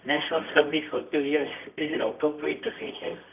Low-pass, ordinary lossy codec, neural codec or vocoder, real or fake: 3.6 kHz; none; codec, 24 kHz, 0.9 kbps, WavTokenizer, medium music audio release; fake